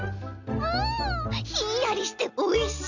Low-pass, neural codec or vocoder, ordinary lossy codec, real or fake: 7.2 kHz; none; none; real